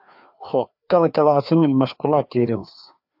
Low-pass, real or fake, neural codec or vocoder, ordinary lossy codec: 5.4 kHz; fake; codec, 16 kHz, 2 kbps, FreqCodec, larger model; AAC, 48 kbps